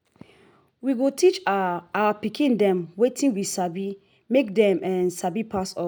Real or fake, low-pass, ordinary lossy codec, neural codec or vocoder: real; none; none; none